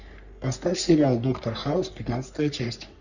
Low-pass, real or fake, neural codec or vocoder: 7.2 kHz; fake; codec, 44.1 kHz, 3.4 kbps, Pupu-Codec